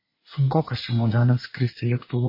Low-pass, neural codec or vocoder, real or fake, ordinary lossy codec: 5.4 kHz; codec, 24 kHz, 1 kbps, SNAC; fake; MP3, 24 kbps